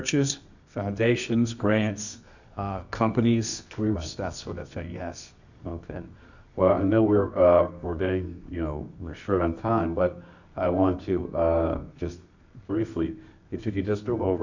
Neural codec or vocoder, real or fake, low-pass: codec, 24 kHz, 0.9 kbps, WavTokenizer, medium music audio release; fake; 7.2 kHz